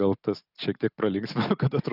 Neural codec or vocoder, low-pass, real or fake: none; 5.4 kHz; real